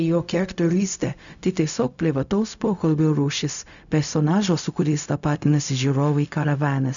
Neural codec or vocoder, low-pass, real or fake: codec, 16 kHz, 0.4 kbps, LongCat-Audio-Codec; 7.2 kHz; fake